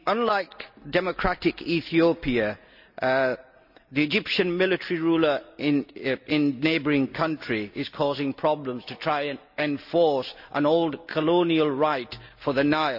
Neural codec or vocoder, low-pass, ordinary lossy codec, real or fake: none; 5.4 kHz; none; real